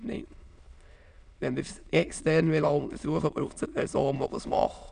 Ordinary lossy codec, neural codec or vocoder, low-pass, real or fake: none; autoencoder, 22.05 kHz, a latent of 192 numbers a frame, VITS, trained on many speakers; 9.9 kHz; fake